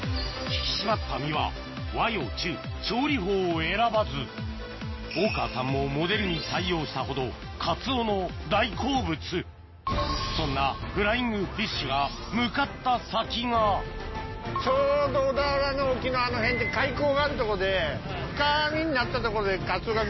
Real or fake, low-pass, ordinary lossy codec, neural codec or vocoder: real; 7.2 kHz; MP3, 24 kbps; none